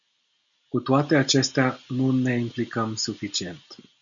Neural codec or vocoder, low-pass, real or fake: none; 7.2 kHz; real